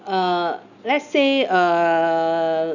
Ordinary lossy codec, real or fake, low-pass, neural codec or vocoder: none; real; 7.2 kHz; none